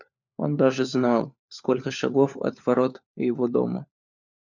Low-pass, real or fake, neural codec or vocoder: 7.2 kHz; fake; codec, 16 kHz, 4 kbps, FunCodec, trained on LibriTTS, 50 frames a second